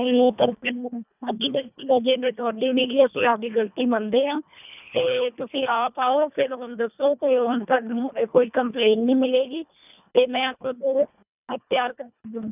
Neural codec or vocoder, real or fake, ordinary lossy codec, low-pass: codec, 24 kHz, 1.5 kbps, HILCodec; fake; none; 3.6 kHz